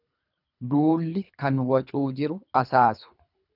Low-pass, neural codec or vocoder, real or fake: 5.4 kHz; codec, 24 kHz, 3 kbps, HILCodec; fake